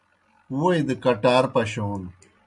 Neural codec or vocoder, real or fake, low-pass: none; real; 10.8 kHz